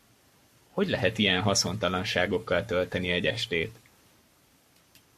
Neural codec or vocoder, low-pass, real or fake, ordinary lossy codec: codec, 44.1 kHz, 7.8 kbps, Pupu-Codec; 14.4 kHz; fake; MP3, 64 kbps